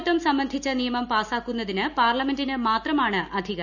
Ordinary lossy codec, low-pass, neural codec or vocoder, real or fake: none; 7.2 kHz; none; real